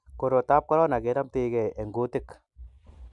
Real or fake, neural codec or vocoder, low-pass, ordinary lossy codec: real; none; 10.8 kHz; MP3, 96 kbps